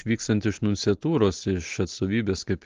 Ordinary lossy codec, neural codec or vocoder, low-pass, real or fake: Opus, 16 kbps; none; 7.2 kHz; real